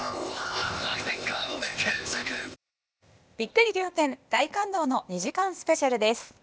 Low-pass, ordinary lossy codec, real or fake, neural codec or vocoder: none; none; fake; codec, 16 kHz, 0.8 kbps, ZipCodec